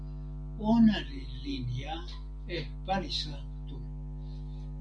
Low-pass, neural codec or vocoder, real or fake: 9.9 kHz; none; real